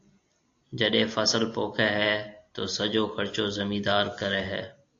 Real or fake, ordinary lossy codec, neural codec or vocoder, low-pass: real; AAC, 48 kbps; none; 7.2 kHz